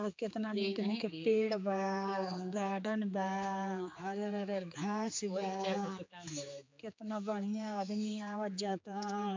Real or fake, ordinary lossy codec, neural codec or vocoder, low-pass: fake; MP3, 64 kbps; codec, 16 kHz, 4 kbps, X-Codec, HuBERT features, trained on general audio; 7.2 kHz